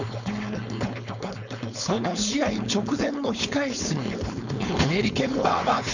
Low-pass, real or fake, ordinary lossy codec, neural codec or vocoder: 7.2 kHz; fake; none; codec, 16 kHz, 4.8 kbps, FACodec